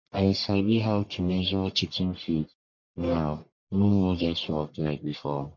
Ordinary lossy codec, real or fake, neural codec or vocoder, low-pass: MP3, 48 kbps; fake; codec, 44.1 kHz, 1.7 kbps, Pupu-Codec; 7.2 kHz